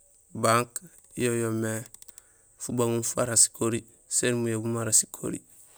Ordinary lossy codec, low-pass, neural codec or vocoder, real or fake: none; none; none; real